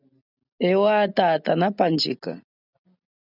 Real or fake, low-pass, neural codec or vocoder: real; 5.4 kHz; none